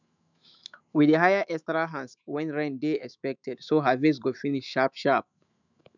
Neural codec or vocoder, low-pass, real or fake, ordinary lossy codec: autoencoder, 48 kHz, 128 numbers a frame, DAC-VAE, trained on Japanese speech; 7.2 kHz; fake; none